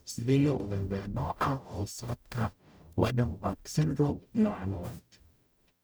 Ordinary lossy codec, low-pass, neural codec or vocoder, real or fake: none; none; codec, 44.1 kHz, 0.9 kbps, DAC; fake